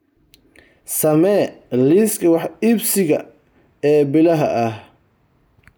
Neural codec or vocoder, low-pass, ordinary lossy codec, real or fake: none; none; none; real